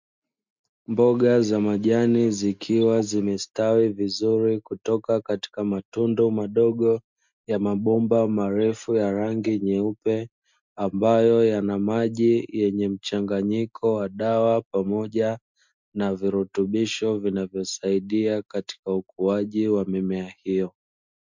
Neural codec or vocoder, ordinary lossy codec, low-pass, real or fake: none; MP3, 64 kbps; 7.2 kHz; real